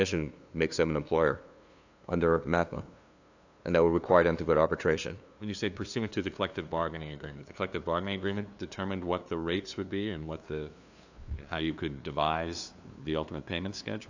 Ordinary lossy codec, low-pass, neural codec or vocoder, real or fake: AAC, 48 kbps; 7.2 kHz; codec, 16 kHz, 2 kbps, FunCodec, trained on LibriTTS, 25 frames a second; fake